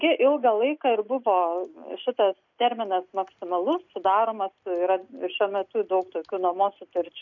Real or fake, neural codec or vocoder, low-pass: real; none; 7.2 kHz